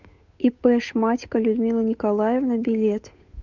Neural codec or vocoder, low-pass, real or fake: codec, 16 kHz, 8 kbps, FunCodec, trained on Chinese and English, 25 frames a second; 7.2 kHz; fake